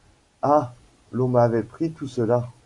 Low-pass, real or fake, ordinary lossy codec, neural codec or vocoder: 10.8 kHz; real; AAC, 64 kbps; none